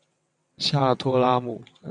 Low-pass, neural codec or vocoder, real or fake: 9.9 kHz; vocoder, 22.05 kHz, 80 mel bands, WaveNeXt; fake